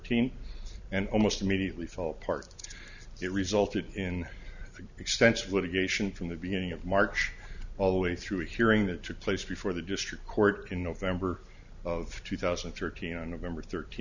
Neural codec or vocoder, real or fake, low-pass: none; real; 7.2 kHz